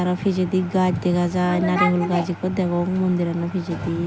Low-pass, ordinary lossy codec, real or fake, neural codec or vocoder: none; none; real; none